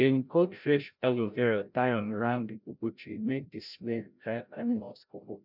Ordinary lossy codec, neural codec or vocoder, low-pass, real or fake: none; codec, 16 kHz, 0.5 kbps, FreqCodec, larger model; 5.4 kHz; fake